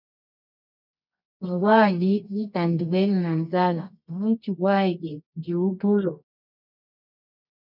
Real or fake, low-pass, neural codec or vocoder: fake; 5.4 kHz; codec, 24 kHz, 0.9 kbps, WavTokenizer, medium music audio release